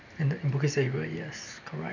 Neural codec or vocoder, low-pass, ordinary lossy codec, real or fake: none; 7.2 kHz; none; real